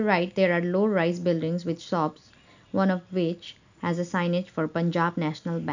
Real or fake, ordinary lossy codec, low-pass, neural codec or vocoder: real; none; 7.2 kHz; none